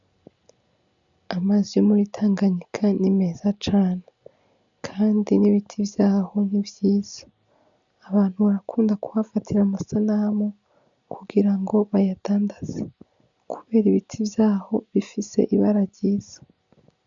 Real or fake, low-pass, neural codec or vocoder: real; 7.2 kHz; none